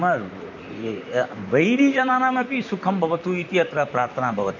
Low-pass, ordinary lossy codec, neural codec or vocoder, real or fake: 7.2 kHz; none; vocoder, 44.1 kHz, 128 mel bands, Pupu-Vocoder; fake